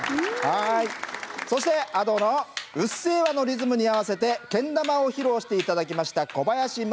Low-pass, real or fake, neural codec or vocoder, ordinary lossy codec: none; real; none; none